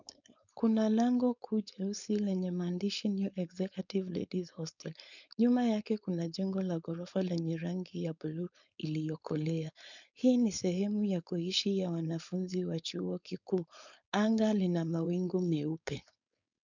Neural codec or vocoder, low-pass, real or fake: codec, 16 kHz, 4.8 kbps, FACodec; 7.2 kHz; fake